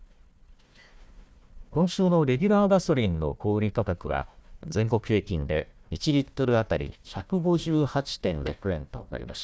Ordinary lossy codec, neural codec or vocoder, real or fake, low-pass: none; codec, 16 kHz, 1 kbps, FunCodec, trained on Chinese and English, 50 frames a second; fake; none